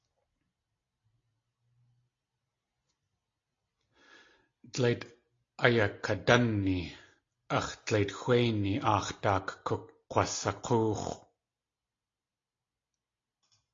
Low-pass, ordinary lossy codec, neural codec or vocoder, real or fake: 7.2 kHz; AAC, 32 kbps; none; real